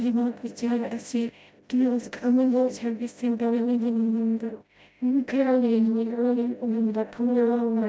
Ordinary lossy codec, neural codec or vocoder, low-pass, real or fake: none; codec, 16 kHz, 0.5 kbps, FreqCodec, smaller model; none; fake